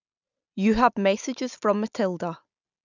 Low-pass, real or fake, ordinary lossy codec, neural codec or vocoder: 7.2 kHz; real; none; none